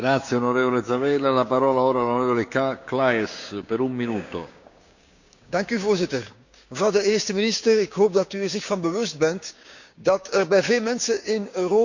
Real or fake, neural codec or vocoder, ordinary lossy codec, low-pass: fake; codec, 16 kHz, 6 kbps, DAC; none; 7.2 kHz